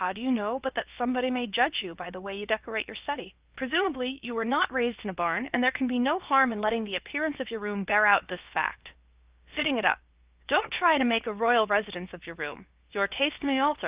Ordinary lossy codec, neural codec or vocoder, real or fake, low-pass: Opus, 32 kbps; codec, 16 kHz, about 1 kbps, DyCAST, with the encoder's durations; fake; 3.6 kHz